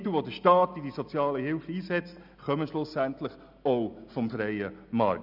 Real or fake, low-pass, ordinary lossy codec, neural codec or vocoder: real; 5.4 kHz; none; none